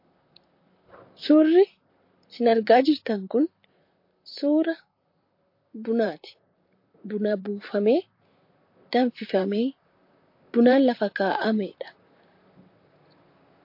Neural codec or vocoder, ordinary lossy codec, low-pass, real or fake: vocoder, 44.1 kHz, 128 mel bands, Pupu-Vocoder; MP3, 32 kbps; 5.4 kHz; fake